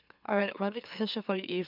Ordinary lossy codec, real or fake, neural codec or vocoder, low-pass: none; fake; autoencoder, 44.1 kHz, a latent of 192 numbers a frame, MeloTTS; 5.4 kHz